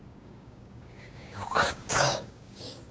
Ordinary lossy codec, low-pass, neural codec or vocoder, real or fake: none; none; codec, 16 kHz, 6 kbps, DAC; fake